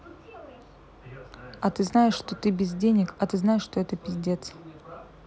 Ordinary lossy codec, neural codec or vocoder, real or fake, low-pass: none; none; real; none